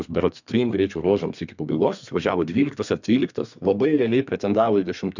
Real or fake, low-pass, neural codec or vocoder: fake; 7.2 kHz; codec, 32 kHz, 1.9 kbps, SNAC